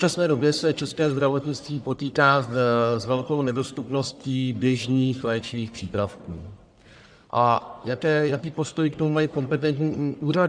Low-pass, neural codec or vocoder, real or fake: 9.9 kHz; codec, 44.1 kHz, 1.7 kbps, Pupu-Codec; fake